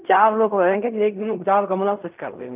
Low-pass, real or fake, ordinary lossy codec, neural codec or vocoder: 3.6 kHz; fake; none; codec, 16 kHz in and 24 kHz out, 0.4 kbps, LongCat-Audio-Codec, fine tuned four codebook decoder